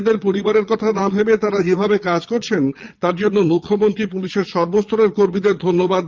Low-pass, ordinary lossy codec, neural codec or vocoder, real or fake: 7.2 kHz; Opus, 24 kbps; vocoder, 22.05 kHz, 80 mel bands, Vocos; fake